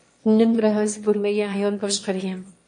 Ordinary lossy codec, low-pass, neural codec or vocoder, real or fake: MP3, 48 kbps; 9.9 kHz; autoencoder, 22.05 kHz, a latent of 192 numbers a frame, VITS, trained on one speaker; fake